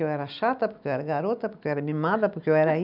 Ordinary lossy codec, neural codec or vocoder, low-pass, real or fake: none; none; 5.4 kHz; real